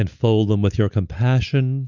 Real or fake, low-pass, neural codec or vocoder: real; 7.2 kHz; none